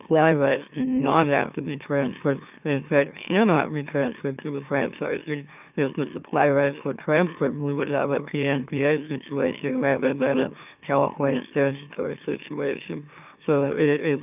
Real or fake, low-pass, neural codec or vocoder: fake; 3.6 kHz; autoencoder, 44.1 kHz, a latent of 192 numbers a frame, MeloTTS